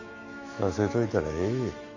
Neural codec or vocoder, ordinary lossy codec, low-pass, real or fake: autoencoder, 48 kHz, 128 numbers a frame, DAC-VAE, trained on Japanese speech; AAC, 48 kbps; 7.2 kHz; fake